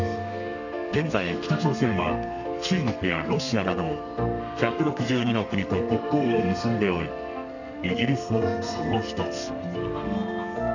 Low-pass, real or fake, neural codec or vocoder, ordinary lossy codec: 7.2 kHz; fake; codec, 32 kHz, 1.9 kbps, SNAC; none